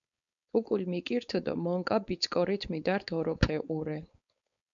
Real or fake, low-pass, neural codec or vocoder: fake; 7.2 kHz; codec, 16 kHz, 4.8 kbps, FACodec